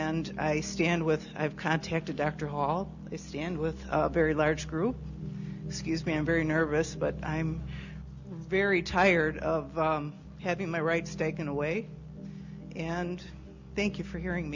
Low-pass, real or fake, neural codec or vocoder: 7.2 kHz; real; none